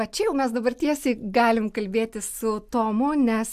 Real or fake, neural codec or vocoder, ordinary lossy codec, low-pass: real; none; AAC, 96 kbps; 14.4 kHz